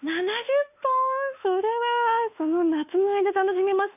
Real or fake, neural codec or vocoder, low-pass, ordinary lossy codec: fake; autoencoder, 48 kHz, 32 numbers a frame, DAC-VAE, trained on Japanese speech; 3.6 kHz; AAC, 32 kbps